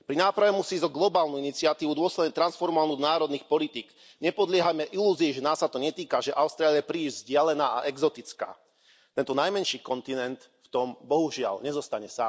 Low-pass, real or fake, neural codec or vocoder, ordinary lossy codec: none; real; none; none